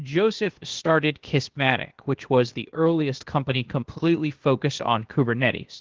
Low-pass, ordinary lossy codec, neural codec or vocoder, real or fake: 7.2 kHz; Opus, 16 kbps; codec, 16 kHz, 0.8 kbps, ZipCodec; fake